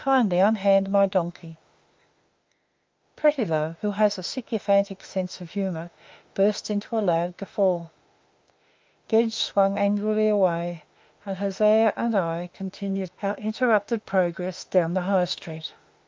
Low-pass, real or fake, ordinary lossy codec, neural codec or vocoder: 7.2 kHz; fake; Opus, 24 kbps; autoencoder, 48 kHz, 32 numbers a frame, DAC-VAE, trained on Japanese speech